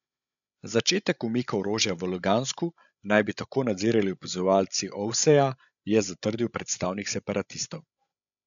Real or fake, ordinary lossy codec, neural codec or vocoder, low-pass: fake; none; codec, 16 kHz, 16 kbps, FreqCodec, larger model; 7.2 kHz